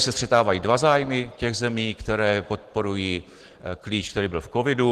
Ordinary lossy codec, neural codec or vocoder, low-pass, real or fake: Opus, 16 kbps; none; 14.4 kHz; real